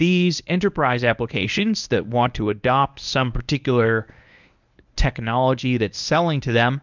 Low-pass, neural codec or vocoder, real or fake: 7.2 kHz; codec, 24 kHz, 0.9 kbps, WavTokenizer, medium speech release version 1; fake